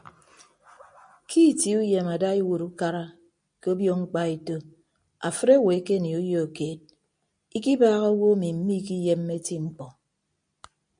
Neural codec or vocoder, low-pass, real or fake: none; 9.9 kHz; real